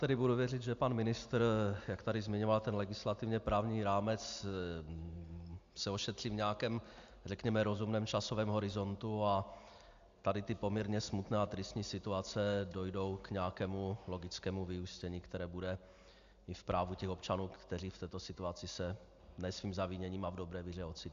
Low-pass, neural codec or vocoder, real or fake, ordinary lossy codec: 7.2 kHz; none; real; AAC, 64 kbps